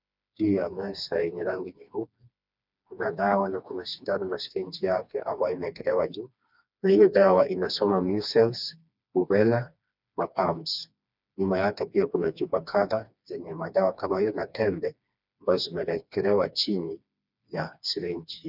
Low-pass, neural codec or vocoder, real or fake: 5.4 kHz; codec, 16 kHz, 2 kbps, FreqCodec, smaller model; fake